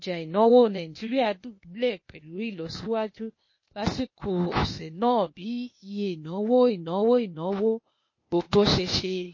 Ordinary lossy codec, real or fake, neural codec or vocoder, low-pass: MP3, 32 kbps; fake; codec, 16 kHz, 0.8 kbps, ZipCodec; 7.2 kHz